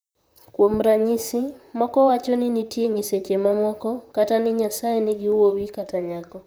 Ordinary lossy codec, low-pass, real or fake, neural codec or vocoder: none; none; fake; vocoder, 44.1 kHz, 128 mel bands, Pupu-Vocoder